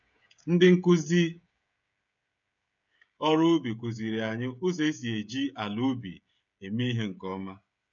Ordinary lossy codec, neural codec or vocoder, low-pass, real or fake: none; codec, 16 kHz, 16 kbps, FreqCodec, smaller model; 7.2 kHz; fake